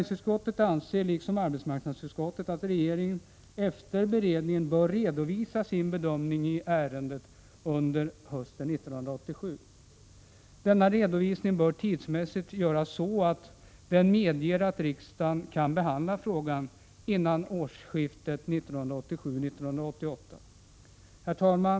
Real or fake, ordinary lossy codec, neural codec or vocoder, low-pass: real; none; none; none